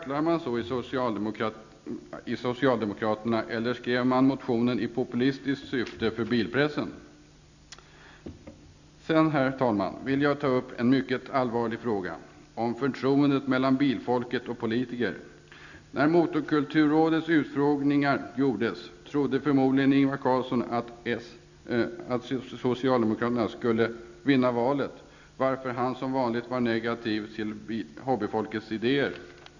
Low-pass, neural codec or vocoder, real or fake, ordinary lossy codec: 7.2 kHz; none; real; none